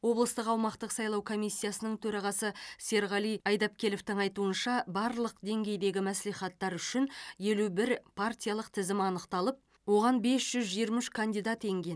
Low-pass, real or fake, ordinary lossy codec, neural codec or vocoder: none; real; none; none